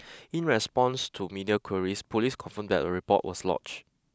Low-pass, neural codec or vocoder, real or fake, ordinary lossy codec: none; none; real; none